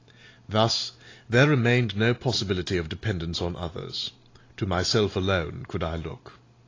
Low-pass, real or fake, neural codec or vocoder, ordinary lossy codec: 7.2 kHz; real; none; AAC, 32 kbps